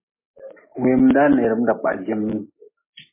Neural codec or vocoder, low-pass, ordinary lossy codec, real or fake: none; 3.6 kHz; MP3, 16 kbps; real